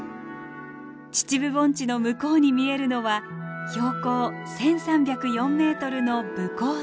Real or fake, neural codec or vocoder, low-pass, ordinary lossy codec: real; none; none; none